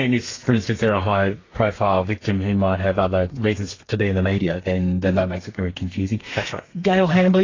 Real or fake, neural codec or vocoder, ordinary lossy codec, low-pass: fake; codec, 32 kHz, 1.9 kbps, SNAC; AAC, 32 kbps; 7.2 kHz